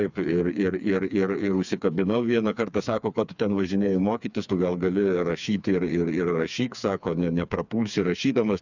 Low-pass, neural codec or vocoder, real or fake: 7.2 kHz; codec, 16 kHz, 4 kbps, FreqCodec, smaller model; fake